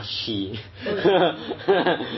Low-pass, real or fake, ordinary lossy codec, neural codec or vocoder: 7.2 kHz; real; MP3, 24 kbps; none